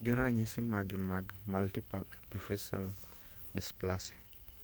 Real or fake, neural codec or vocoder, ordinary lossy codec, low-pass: fake; codec, 44.1 kHz, 2.6 kbps, SNAC; none; none